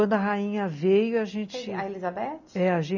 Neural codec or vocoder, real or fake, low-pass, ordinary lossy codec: none; real; 7.2 kHz; none